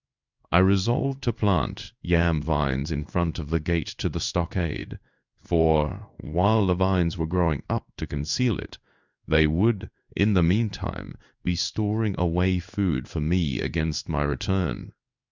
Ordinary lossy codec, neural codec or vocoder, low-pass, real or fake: Opus, 64 kbps; codec, 16 kHz in and 24 kHz out, 1 kbps, XY-Tokenizer; 7.2 kHz; fake